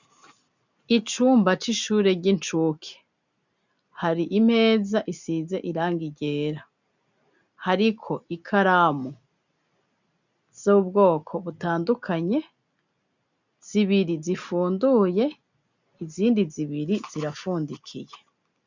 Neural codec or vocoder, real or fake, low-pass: none; real; 7.2 kHz